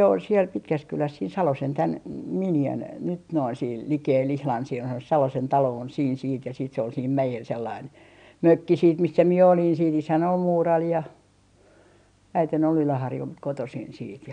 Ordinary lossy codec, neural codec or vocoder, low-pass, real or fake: none; none; 9.9 kHz; real